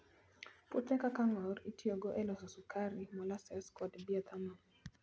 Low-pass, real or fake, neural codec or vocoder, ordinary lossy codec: none; real; none; none